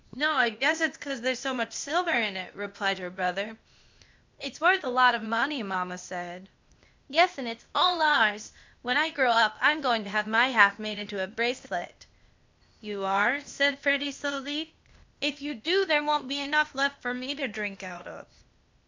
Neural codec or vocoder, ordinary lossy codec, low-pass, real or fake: codec, 16 kHz, 0.8 kbps, ZipCodec; MP3, 64 kbps; 7.2 kHz; fake